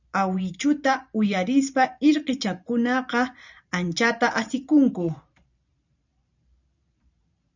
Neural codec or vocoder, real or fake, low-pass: vocoder, 44.1 kHz, 128 mel bands every 512 samples, BigVGAN v2; fake; 7.2 kHz